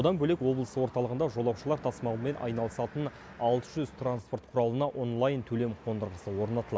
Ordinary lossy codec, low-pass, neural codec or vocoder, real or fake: none; none; none; real